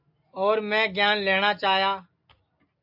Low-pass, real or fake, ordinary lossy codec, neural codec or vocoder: 5.4 kHz; real; AAC, 48 kbps; none